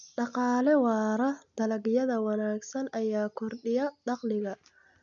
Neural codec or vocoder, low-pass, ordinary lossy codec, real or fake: none; 7.2 kHz; none; real